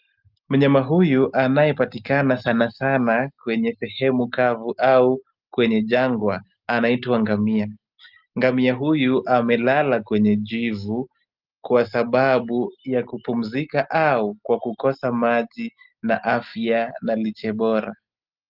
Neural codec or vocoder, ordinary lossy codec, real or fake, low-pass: none; Opus, 32 kbps; real; 5.4 kHz